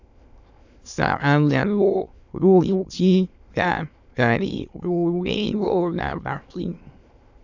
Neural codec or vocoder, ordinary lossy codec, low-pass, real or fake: autoencoder, 22.05 kHz, a latent of 192 numbers a frame, VITS, trained on many speakers; AAC, 48 kbps; 7.2 kHz; fake